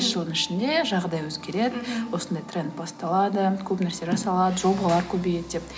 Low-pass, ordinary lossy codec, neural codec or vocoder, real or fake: none; none; none; real